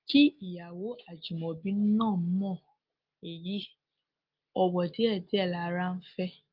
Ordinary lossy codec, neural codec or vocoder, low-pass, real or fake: Opus, 32 kbps; none; 5.4 kHz; real